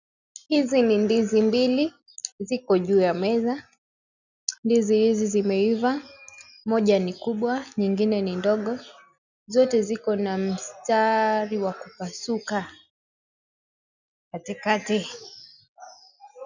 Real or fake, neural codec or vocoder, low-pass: real; none; 7.2 kHz